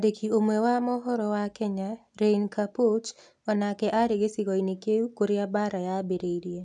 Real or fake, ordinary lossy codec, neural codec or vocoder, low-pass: real; none; none; 10.8 kHz